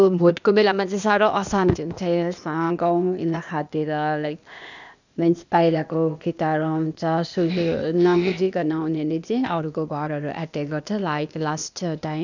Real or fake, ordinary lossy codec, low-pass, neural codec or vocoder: fake; none; 7.2 kHz; codec, 16 kHz, 0.8 kbps, ZipCodec